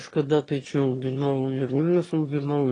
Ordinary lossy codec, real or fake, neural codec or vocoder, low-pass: AAC, 32 kbps; fake; autoencoder, 22.05 kHz, a latent of 192 numbers a frame, VITS, trained on one speaker; 9.9 kHz